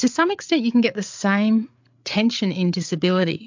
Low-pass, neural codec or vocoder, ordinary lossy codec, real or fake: 7.2 kHz; codec, 16 kHz, 8 kbps, FreqCodec, larger model; MP3, 64 kbps; fake